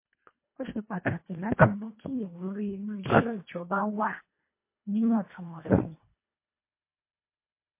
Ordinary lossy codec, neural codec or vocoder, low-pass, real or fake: MP3, 24 kbps; codec, 24 kHz, 1.5 kbps, HILCodec; 3.6 kHz; fake